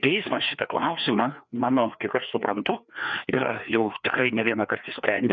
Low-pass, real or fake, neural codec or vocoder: 7.2 kHz; fake; codec, 16 kHz, 2 kbps, FreqCodec, larger model